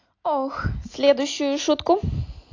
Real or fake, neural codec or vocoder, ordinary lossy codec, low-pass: real; none; AAC, 48 kbps; 7.2 kHz